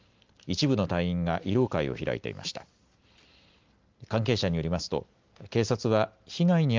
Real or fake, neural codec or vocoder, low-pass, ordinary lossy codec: real; none; 7.2 kHz; Opus, 32 kbps